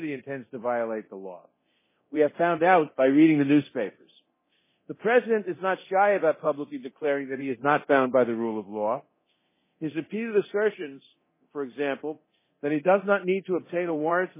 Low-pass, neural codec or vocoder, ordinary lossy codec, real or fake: 3.6 kHz; codec, 24 kHz, 0.9 kbps, DualCodec; MP3, 16 kbps; fake